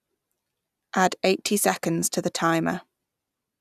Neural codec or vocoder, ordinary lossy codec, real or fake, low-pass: none; none; real; 14.4 kHz